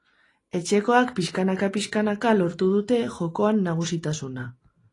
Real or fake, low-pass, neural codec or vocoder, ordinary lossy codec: real; 10.8 kHz; none; AAC, 32 kbps